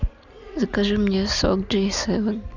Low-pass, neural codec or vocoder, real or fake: 7.2 kHz; none; real